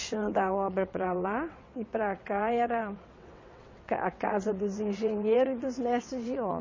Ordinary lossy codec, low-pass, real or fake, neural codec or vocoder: MP3, 32 kbps; 7.2 kHz; fake; vocoder, 22.05 kHz, 80 mel bands, WaveNeXt